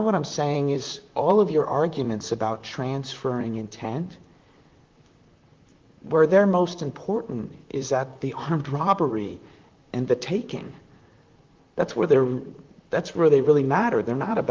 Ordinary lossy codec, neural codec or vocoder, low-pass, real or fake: Opus, 32 kbps; vocoder, 44.1 kHz, 128 mel bands, Pupu-Vocoder; 7.2 kHz; fake